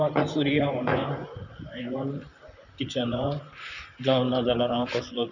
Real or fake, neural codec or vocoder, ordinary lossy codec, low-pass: fake; vocoder, 44.1 kHz, 128 mel bands, Pupu-Vocoder; none; 7.2 kHz